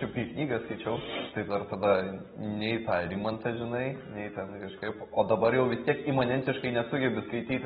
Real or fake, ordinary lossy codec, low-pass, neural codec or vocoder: real; AAC, 16 kbps; 19.8 kHz; none